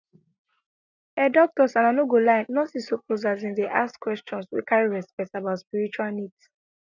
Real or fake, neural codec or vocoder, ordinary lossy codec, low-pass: real; none; none; 7.2 kHz